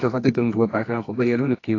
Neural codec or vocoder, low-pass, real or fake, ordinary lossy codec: codec, 24 kHz, 0.9 kbps, WavTokenizer, medium music audio release; 7.2 kHz; fake; AAC, 32 kbps